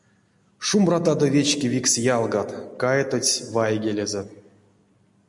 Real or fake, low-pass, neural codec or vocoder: real; 10.8 kHz; none